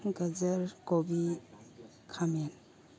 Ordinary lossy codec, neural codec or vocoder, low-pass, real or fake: none; none; none; real